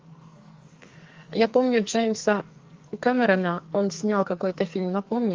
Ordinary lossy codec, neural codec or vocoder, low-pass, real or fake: Opus, 32 kbps; codec, 44.1 kHz, 2.6 kbps, SNAC; 7.2 kHz; fake